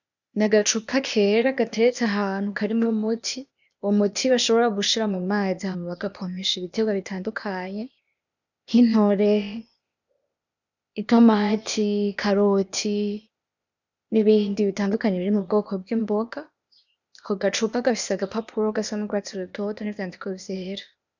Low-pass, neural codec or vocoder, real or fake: 7.2 kHz; codec, 16 kHz, 0.8 kbps, ZipCodec; fake